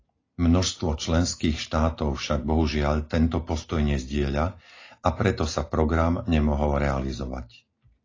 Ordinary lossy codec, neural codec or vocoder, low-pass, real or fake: AAC, 32 kbps; none; 7.2 kHz; real